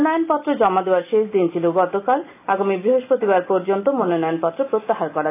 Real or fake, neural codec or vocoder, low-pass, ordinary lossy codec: real; none; 3.6 kHz; AAC, 24 kbps